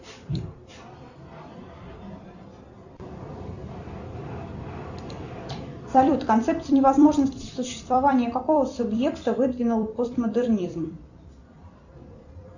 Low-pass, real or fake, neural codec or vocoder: 7.2 kHz; fake; vocoder, 44.1 kHz, 128 mel bands every 256 samples, BigVGAN v2